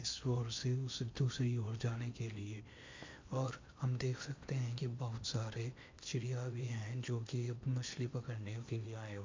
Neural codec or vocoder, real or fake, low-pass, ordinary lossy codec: codec, 16 kHz in and 24 kHz out, 0.8 kbps, FocalCodec, streaming, 65536 codes; fake; 7.2 kHz; MP3, 48 kbps